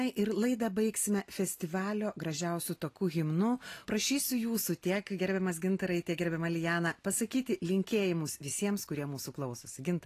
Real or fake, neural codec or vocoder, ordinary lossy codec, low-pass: real; none; AAC, 48 kbps; 14.4 kHz